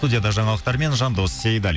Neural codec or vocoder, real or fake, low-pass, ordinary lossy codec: none; real; none; none